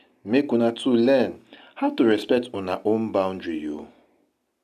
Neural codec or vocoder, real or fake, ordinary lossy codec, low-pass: none; real; none; 14.4 kHz